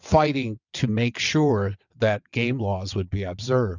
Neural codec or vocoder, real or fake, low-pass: vocoder, 22.05 kHz, 80 mel bands, WaveNeXt; fake; 7.2 kHz